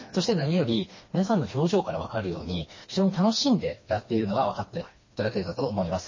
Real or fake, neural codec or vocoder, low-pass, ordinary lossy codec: fake; codec, 16 kHz, 2 kbps, FreqCodec, smaller model; 7.2 kHz; MP3, 32 kbps